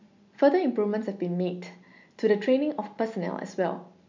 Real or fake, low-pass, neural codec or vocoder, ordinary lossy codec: real; 7.2 kHz; none; MP3, 64 kbps